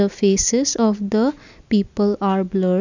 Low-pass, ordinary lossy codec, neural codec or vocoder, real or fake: 7.2 kHz; none; none; real